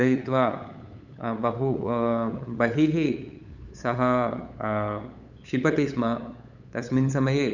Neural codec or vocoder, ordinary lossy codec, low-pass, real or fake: codec, 16 kHz, 8 kbps, FunCodec, trained on LibriTTS, 25 frames a second; none; 7.2 kHz; fake